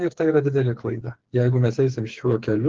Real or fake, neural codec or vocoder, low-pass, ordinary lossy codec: fake; codec, 16 kHz, 4 kbps, FreqCodec, smaller model; 7.2 kHz; Opus, 16 kbps